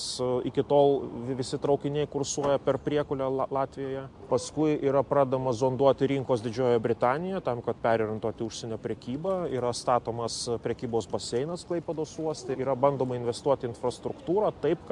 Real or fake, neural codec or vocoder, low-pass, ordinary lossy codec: real; none; 10.8 kHz; MP3, 64 kbps